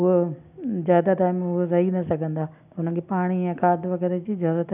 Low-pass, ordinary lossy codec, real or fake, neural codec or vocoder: 3.6 kHz; none; real; none